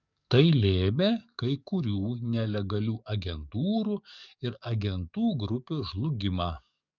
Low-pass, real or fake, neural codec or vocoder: 7.2 kHz; fake; codec, 44.1 kHz, 7.8 kbps, DAC